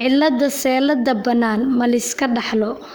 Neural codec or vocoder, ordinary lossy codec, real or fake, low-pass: codec, 44.1 kHz, 7.8 kbps, Pupu-Codec; none; fake; none